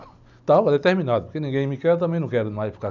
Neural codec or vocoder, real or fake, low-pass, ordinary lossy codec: none; real; 7.2 kHz; none